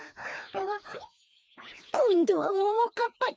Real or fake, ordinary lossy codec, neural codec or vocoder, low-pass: fake; none; codec, 16 kHz, 2 kbps, FreqCodec, larger model; none